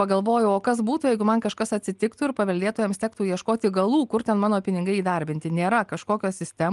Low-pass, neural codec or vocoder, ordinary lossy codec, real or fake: 10.8 kHz; none; Opus, 32 kbps; real